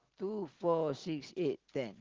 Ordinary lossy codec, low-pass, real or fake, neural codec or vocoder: Opus, 16 kbps; 7.2 kHz; real; none